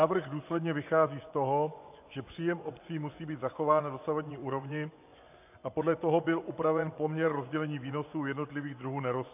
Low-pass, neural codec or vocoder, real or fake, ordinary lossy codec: 3.6 kHz; vocoder, 24 kHz, 100 mel bands, Vocos; fake; AAC, 32 kbps